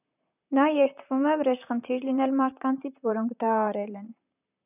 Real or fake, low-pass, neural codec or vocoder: fake; 3.6 kHz; vocoder, 44.1 kHz, 128 mel bands, Pupu-Vocoder